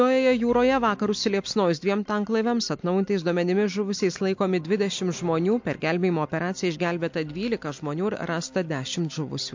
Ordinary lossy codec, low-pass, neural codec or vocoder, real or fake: MP3, 48 kbps; 7.2 kHz; none; real